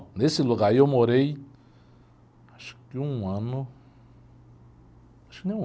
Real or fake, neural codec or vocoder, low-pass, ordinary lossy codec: real; none; none; none